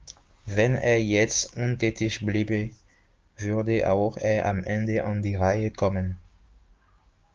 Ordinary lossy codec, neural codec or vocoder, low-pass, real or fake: Opus, 24 kbps; codec, 16 kHz, 6 kbps, DAC; 7.2 kHz; fake